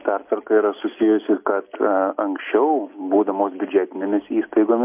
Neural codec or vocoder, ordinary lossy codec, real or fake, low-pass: none; MP3, 32 kbps; real; 3.6 kHz